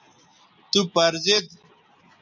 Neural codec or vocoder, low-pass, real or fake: none; 7.2 kHz; real